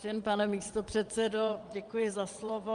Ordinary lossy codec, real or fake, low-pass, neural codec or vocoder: Opus, 32 kbps; fake; 9.9 kHz; vocoder, 22.05 kHz, 80 mel bands, Vocos